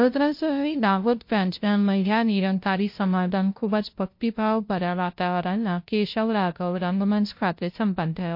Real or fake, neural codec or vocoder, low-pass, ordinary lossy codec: fake; codec, 16 kHz, 0.5 kbps, FunCodec, trained on LibriTTS, 25 frames a second; 5.4 kHz; MP3, 32 kbps